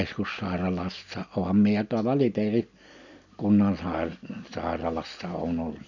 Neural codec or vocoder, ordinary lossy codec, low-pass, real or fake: none; none; 7.2 kHz; real